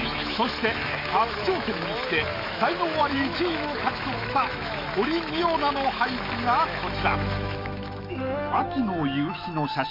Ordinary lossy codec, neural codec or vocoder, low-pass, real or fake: MP3, 48 kbps; autoencoder, 48 kHz, 128 numbers a frame, DAC-VAE, trained on Japanese speech; 5.4 kHz; fake